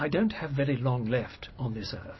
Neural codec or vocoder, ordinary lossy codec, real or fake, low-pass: none; MP3, 24 kbps; real; 7.2 kHz